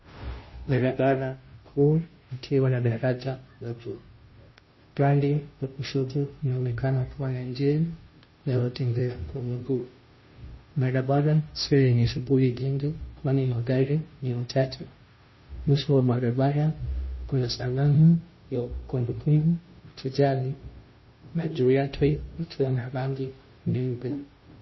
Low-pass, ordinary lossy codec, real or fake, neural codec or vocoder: 7.2 kHz; MP3, 24 kbps; fake; codec, 16 kHz, 0.5 kbps, FunCodec, trained on Chinese and English, 25 frames a second